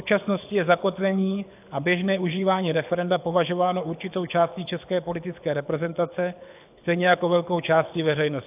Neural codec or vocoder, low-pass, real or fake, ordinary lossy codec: codec, 24 kHz, 6 kbps, HILCodec; 3.6 kHz; fake; AAC, 32 kbps